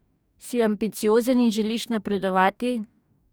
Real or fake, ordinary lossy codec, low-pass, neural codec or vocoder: fake; none; none; codec, 44.1 kHz, 2.6 kbps, DAC